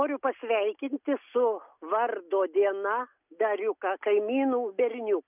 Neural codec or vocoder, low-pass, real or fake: none; 3.6 kHz; real